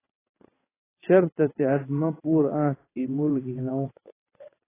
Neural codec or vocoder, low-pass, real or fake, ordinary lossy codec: vocoder, 22.05 kHz, 80 mel bands, WaveNeXt; 3.6 kHz; fake; AAC, 16 kbps